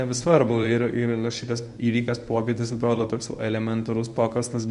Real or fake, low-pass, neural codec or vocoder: fake; 10.8 kHz; codec, 24 kHz, 0.9 kbps, WavTokenizer, medium speech release version 1